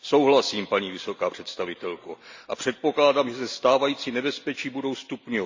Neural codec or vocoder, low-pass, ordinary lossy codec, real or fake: none; 7.2 kHz; MP3, 64 kbps; real